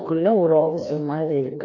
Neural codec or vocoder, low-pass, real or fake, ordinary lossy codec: codec, 16 kHz, 1 kbps, FreqCodec, larger model; 7.2 kHz; fake; none